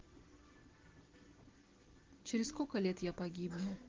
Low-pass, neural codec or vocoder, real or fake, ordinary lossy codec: 7.2 kHz; none; real; Opus, 16 kbps